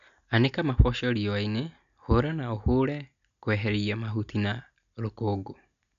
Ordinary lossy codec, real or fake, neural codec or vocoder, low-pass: none; real; none; 7.2 kHz